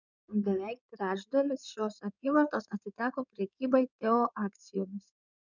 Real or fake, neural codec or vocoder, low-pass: fake; codec, 16 kHz in and 24 kHz out, 2.2 kbps, FireRedTTS-2 codec; 7.2 kHz